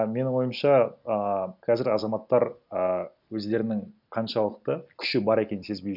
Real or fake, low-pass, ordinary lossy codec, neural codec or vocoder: real; 5.4 kHz; none; none